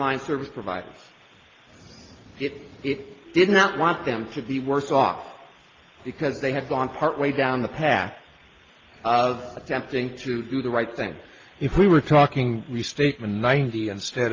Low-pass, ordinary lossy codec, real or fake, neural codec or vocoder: 7.2 kHz; Opus, 24 kbps; real; none